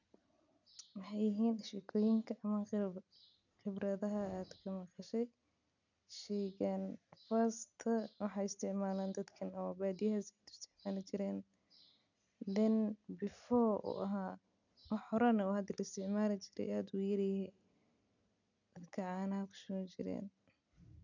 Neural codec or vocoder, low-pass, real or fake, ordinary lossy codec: none; 7.2 kHz; real; none